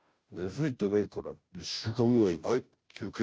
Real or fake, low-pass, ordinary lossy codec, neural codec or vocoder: fake; none; none; codec, 16 kHz, 0.5 kbps, FunCodec, trained on Chinese and English, 25 frames a second